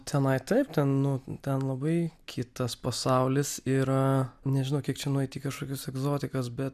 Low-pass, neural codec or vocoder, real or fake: 14.4 kHz; none; real